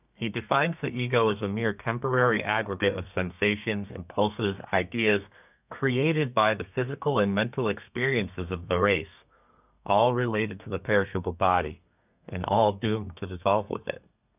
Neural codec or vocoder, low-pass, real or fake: codec, 32 kHz, 1.9 kbps, SNAC; 3.6 kHz; fake